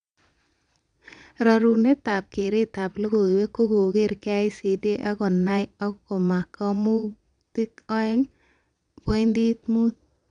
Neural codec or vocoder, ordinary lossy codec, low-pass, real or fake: vocoder, 22.05 kHz, 80 mel bands, Vocos; none; 9.9 kHz; fake